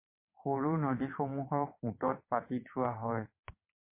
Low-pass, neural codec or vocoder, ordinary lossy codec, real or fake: 3.6 kHz; vocoder, 22.05 kHz, 80 mel bands, WaveNeXt; MP3, 24 kbps; fake